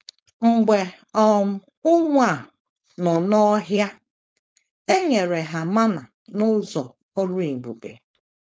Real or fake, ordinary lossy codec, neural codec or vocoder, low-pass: fake; none; codec, 16 kHz, 4.8 kbps, FACodec; none